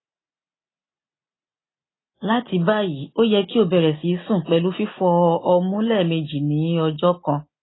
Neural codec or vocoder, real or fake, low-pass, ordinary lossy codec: none; real; 7.2 kHz; AAC, 16 kbps